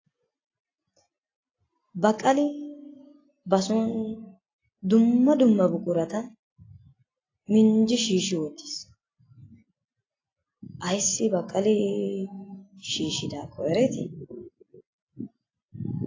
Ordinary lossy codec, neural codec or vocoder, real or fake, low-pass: AAC, 32 kbps; none; real; 7.2 kHz